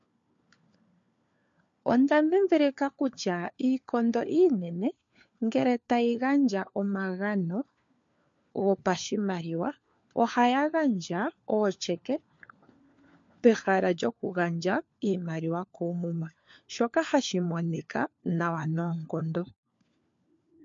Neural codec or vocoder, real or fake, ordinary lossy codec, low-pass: codec, 16 kHz, 4 kbps, FunCodec, trained on LibriTTS, 50 frames a second; fake; MP3, 48 kbps; 7.2 kHz